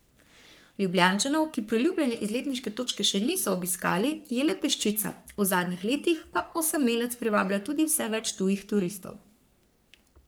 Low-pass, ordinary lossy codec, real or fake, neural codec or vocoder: none; none; fake; codec, 44.1 kHz, 3.4 kbps, Pupu-Codec